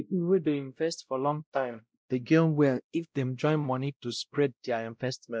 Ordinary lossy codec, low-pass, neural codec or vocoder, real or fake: none; none; codec, 16 kHz, 0.5 kbps, X-Codec, WavLM features, trained on Multilingual LibriSpeech; fake